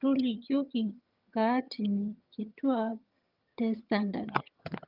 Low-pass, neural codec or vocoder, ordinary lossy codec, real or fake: 5.4 kHz; vocoder, 22.05 kHz, 80 mel bands, HiFi-GAN; Opus, 24 kbps; fake